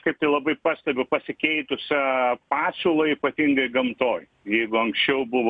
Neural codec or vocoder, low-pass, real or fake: none; 9.9 kHz; real